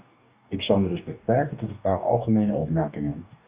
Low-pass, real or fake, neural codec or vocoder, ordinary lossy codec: 3.6 kHz; fake; codec, 44.1 kHz, 2.6 kbps, DAC; Opus, 64 kbps